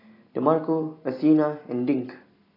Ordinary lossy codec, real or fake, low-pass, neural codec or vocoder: AAC, 24 kbps; real; 5.4 kHz; none